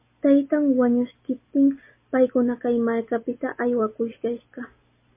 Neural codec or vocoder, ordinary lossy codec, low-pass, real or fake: none; MP3, 24 kbps; 3.6 kHz; real